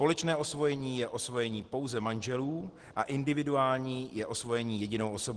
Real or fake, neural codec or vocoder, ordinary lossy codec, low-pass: real; none; Opus, 16 kbps; 10.8 kHz